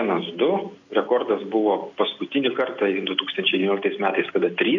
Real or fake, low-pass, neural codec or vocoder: real; 7.2 kHz; none